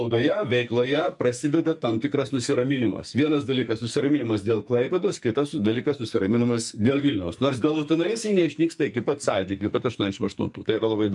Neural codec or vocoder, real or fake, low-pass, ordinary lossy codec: codec, 44.1 kHz, 2.6 kbps, SNAC; fake; 10.8 kHz; MP3, 64 kbps